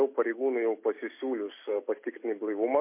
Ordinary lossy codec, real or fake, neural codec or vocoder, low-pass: MP3, 24 kbps; real; none; 3.6 kHz